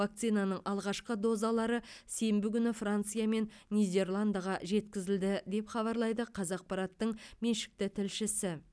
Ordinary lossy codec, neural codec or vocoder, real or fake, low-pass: none; none; real; none